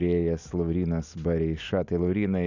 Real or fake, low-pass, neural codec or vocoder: real; 7.2 kHz; none